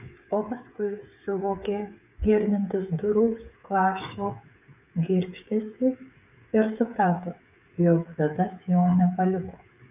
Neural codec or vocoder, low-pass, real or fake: codec, 16 kHz, 8 kbps, FreqCodec, smaller model; 3.6 kHz; fake